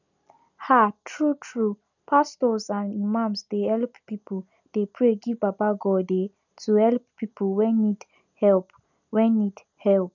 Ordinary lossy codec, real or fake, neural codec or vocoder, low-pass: none; real; none; 7.2 kHz